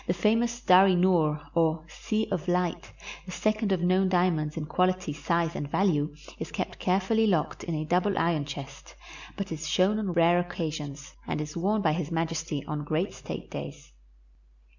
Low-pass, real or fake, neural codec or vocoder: 7.2 kHz; real; none